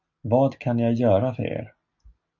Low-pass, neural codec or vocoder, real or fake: 7.2 kHz; none; real